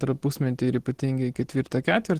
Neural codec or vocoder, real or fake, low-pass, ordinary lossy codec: none; real; 14.4 kHz; Opus, 16 kbps